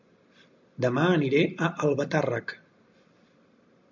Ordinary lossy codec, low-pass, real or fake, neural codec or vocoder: MP3, 64 kbps; 7.2 kHz; real; none